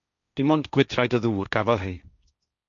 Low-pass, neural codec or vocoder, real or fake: 7.2 kHz; codec, 16 kHz, 1.1 kbps, Voila-Tokenizer; fake